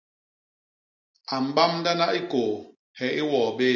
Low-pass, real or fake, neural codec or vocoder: 7.2 kHz; real; none